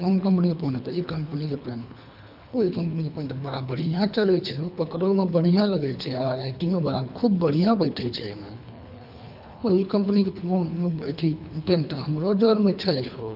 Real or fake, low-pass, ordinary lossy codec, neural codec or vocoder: fake; 5.4 kHz; none; codec, 24 kHz, 3 kbps, HILCodec